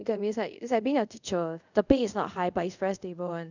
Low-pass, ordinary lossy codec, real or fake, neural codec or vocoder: 7.2 kHz; none; fake; codec, 24 kHz, 0.5 kbps, DualCodec